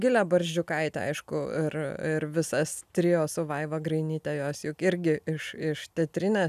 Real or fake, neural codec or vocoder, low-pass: real; none; 14.4 kHz